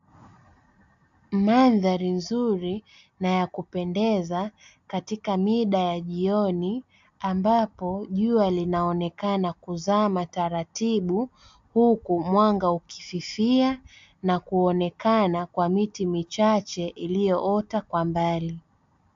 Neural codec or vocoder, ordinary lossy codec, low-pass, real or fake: none; AAC, 64 kbps; 7.2 kHz; real